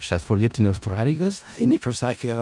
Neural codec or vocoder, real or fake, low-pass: codec, 16 kHz in and 24 kHz out, 0.4 kbps, LongCat-Audio-Codec, four codebook decoder; fake; 10.8 kHz